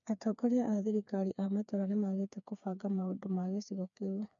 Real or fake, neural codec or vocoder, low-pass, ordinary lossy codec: fake; codec, 16 kHz, 4 kbps, FreqCodec, smaller model; 7.2 kHz; none